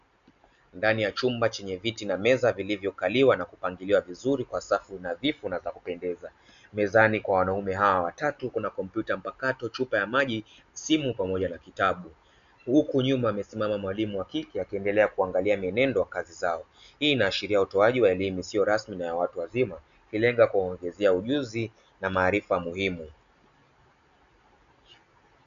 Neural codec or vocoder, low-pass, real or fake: none; 7.2 kHz; real